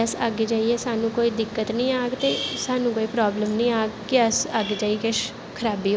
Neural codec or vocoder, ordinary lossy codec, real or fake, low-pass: none; none; real; none